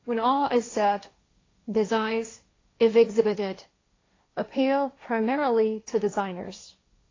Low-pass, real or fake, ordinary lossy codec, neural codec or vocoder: 7.2 kHz; fake; AAC, 32 kbps; codec, 16 kHz, 1.1 kbps, Voila-Tokenizer